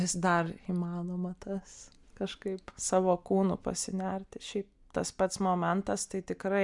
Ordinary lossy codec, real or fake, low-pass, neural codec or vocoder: MP3, 96 kbps; real; 10.8 kHz; none